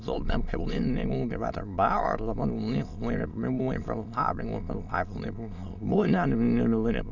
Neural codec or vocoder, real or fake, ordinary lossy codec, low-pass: autoencoder, 22.05 kHz, a latent of 192 numbers a frame, VITS, trained on many speakers; fake; none; 7.2 kHz